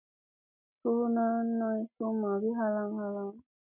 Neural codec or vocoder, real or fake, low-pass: none; real; 3.6 kHz